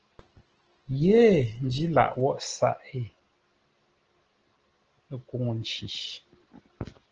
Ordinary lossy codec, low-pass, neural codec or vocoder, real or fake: Opus, 16 kbps; 7.2 kHz; none; real